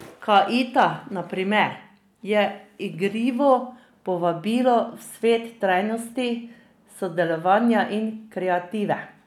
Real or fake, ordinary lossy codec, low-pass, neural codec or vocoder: real; none; 19.8 kHz; none